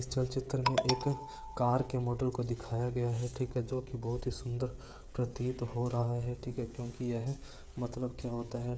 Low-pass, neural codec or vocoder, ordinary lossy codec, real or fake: none; codec, 16 kHz, 16 kbps, FreqCodec, smaller model; none; fake